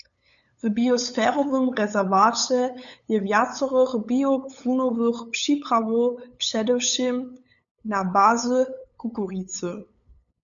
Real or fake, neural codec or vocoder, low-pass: fake; codec, 16 kHz, 8 kbps, FunCodec, trained on LibriTTS, 25 frames a second; 7.2 kHz